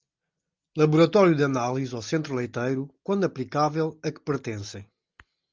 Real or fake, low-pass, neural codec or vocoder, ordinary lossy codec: real; 7.2 kHz; none; Opus, 24 kbps